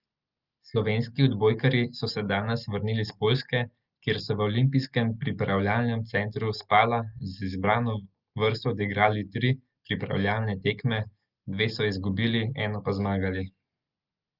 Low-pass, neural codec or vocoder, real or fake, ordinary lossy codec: 5.4 kHz; none; real; Opus, 24 kbps